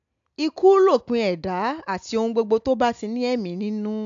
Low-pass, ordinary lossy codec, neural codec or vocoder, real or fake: 7.2 kHz; AAC, 64 kbps; none; real